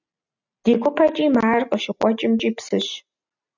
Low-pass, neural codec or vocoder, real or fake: 7.2 kHz; none; real